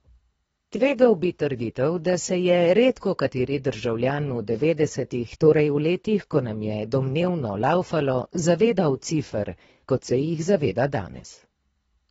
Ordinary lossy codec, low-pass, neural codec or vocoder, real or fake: AAC, 24 kbps; 10.8 kHz; codec, 24 kHz, 3 kbps, HILCodec; fake